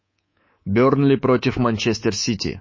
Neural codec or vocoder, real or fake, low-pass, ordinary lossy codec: codec, 24 kHz, 3.1 kbps, DualCodec; fake; 7.2 kHz; MP3, 32 kbps